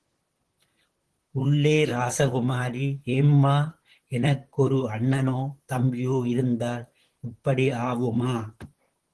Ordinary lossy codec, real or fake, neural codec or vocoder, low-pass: Opus, 16 kbps; fake; vocoder, 44.1 kHz, 128 mel bands, Pupu-Vocoder; 10.8 kHz